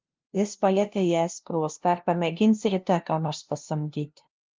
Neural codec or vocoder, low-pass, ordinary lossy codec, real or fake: codec, 16 kHz, 0.5 kbps, FunCodec, trained on LibriTTS, 25 frames a second; 7.2 kHz; Opus, 32 kbps; fake